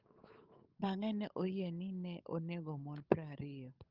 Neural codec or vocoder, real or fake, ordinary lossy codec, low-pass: codec, 16 kHz, 8 kbps, FunCodec, trained on Chinese and English, 25 frames a second; fake; Opus, 32 kbps; 5.4 kHz